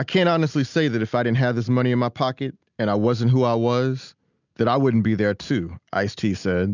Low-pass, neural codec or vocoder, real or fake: 7.2 kHz; none; real